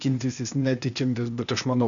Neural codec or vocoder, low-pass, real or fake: codec, 16 kHz, 0.8 kbps, ZipCodec; 7.2 kHz; fake